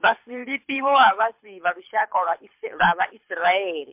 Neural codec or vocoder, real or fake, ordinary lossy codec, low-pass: codec, 24 kHz, 6 kbps, HILCodec; fake; MP3, 32 kbps; 3.6 kHz